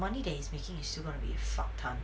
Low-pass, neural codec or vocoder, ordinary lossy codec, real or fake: none; none; none; real